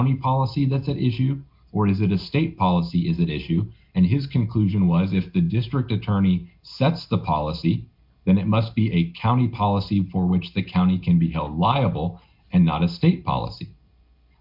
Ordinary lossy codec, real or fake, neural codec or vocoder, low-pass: MP3, 48 kbps; real; none; 5.4 kHz